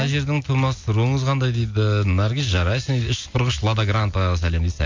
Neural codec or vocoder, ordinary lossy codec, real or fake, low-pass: none; MP3, 48 kbps; real; 7.2 kHz